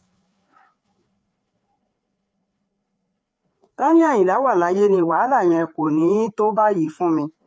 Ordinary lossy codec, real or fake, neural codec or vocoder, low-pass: none; fake; codec, 16 kHz, 4 kbps, FreqCodec, larger model; none